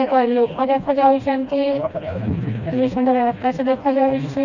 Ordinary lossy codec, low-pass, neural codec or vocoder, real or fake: none; 7.2 kHz; codec, 16 kHz, 1 kbps, FreqCodec, smaller model; fake